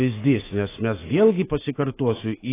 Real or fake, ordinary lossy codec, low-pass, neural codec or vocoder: fake; AAC, 16 kbps; 3.6 kHz; autoencoder, 48 kHz, 128 numbers a frame, DAC-VAE, trained on Japanese speech